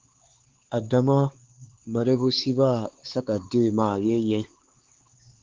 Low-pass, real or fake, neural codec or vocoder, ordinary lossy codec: 7.2 kHz; fake; codec, 16 kHz, 4 kbps, X-Codec, HuBERT features, trained on LibriSpeech; Opus, 16 kbps